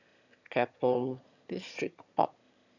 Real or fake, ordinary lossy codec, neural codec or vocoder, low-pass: fake; none; autoencoder, 22.05 kHz, a latent of 192 numbers a frame, VITS, trained on one speaker; 7.2 kHz